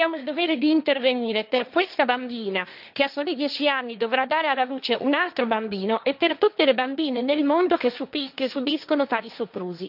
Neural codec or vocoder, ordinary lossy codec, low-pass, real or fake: codec, 16 kHz, 1.1 kbps, Voila-Tokenizer; none; 5.4 kHz; fake